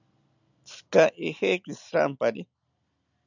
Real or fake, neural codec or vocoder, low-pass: real; none; 7.2 kHz